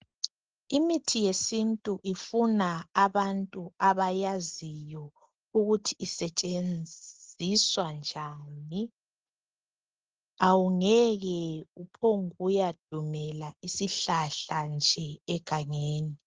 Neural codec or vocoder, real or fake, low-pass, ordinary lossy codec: none; real; 7.2 kHz; Opus, 16 kbps